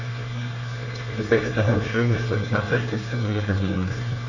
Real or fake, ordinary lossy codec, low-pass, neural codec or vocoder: fake; none; 7.2 kHz; codec, 24 kHz, 1 kbps, SNAC